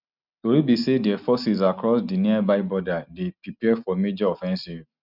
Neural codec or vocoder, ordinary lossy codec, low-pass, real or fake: none; none; 5.4 kHz; real